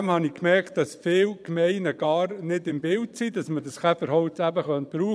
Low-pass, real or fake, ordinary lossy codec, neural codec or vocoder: none; fake; none; vocoder, 22.05 kHz, 80 mel bands, Vocos